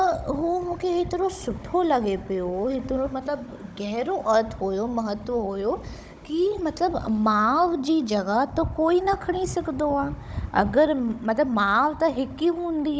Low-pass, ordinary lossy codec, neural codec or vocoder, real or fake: none; none; codec, 16 kHz, 16 kbps, FunCodec, trained on Chinese and English, 50 frames a second; fake